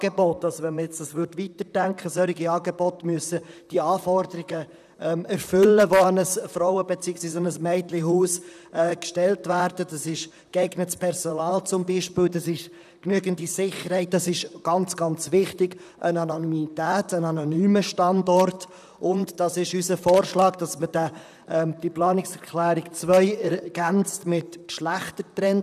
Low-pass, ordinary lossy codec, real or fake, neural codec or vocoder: 14.4 kHz; none; fake; vocoder, 44.1 kHz, 128 mel bands, Pupu-Vocoder